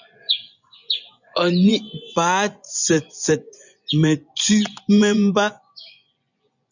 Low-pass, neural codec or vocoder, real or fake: 7.2 kHz; vocoder, 24 kHz, 100 mel bands, Vocos; fake